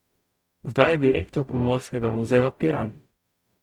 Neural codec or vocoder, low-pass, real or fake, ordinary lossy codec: codec, 44.1 kHz, 0.9 kbps, DAC; 19.8 kHz; fake; Opus, 64 kbps